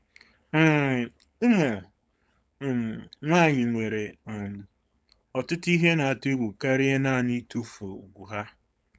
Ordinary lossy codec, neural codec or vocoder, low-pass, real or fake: none; codec, 16 kHz, 4.8 kbps, FACodec; none; fake